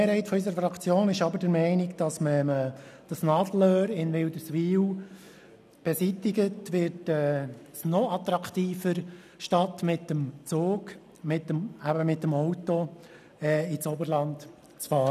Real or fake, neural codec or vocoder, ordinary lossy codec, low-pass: real; none; none; 14.4 kHz